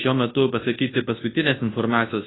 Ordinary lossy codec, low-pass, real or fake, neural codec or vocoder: AAC, 16 kbps; 7.2 kHz; fake; codec, 24 kHz, 0.9 kbps, WavTokenizer, large speech release